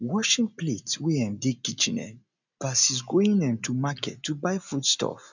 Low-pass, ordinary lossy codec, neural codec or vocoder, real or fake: 7.2 kHz; none; none; real